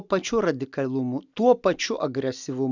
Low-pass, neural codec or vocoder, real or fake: 7.2 kHz; none; real